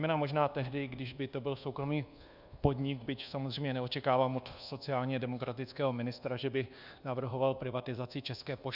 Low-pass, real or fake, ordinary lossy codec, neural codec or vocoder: 5.4 kHz; fake; Opus, 64 kbps; codec, 24 kHz, 1.2 kbps, DualCodec